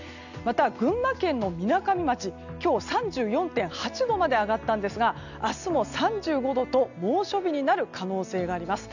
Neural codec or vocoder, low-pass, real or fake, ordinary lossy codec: none; 7.2 kHz; real; none